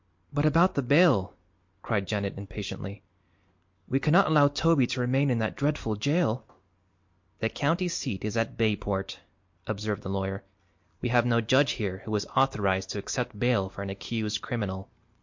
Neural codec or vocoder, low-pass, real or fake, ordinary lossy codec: none; 7.2 kHz; real; MP3, 48 kbps